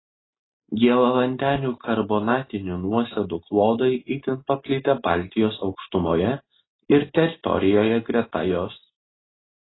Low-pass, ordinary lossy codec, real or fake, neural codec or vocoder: 7.2 kHz; AAC, 16 kbps; real; none